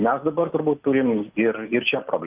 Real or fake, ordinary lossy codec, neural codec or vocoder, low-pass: real; Opus, 24 kbps; none; 3.6 kHz